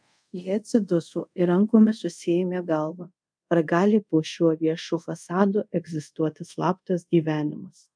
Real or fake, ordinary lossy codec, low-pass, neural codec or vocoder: fake; MP3, 96 kbps; 9.9 kHz; codec, 24 kHz, 0.5 kbps, DualCodec